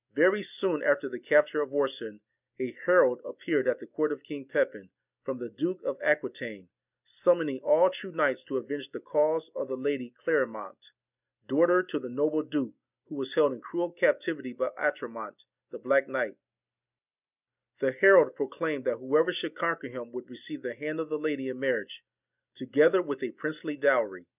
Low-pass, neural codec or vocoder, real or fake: 3.6 kHz; none; real